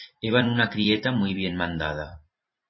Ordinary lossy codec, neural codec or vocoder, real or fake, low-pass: MP3, 24 kbps; vocoder, 44.1 kHz, 128 mel bands every 256 samples, BigVGAN v2; fake; 7.2 kHz